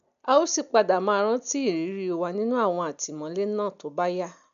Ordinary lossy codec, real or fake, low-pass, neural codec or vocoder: none; real; 7.2 kHz; none